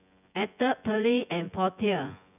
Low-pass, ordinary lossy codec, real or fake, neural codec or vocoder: 3.6 kHz; none; fake; vocoder, 24 kHz, 100 mel bands, Vocos